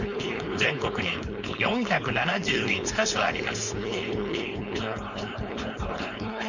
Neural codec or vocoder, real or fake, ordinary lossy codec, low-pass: codec, 16 kHz, 4.8 kbps, FACodec; fake; none; 7.2 kHz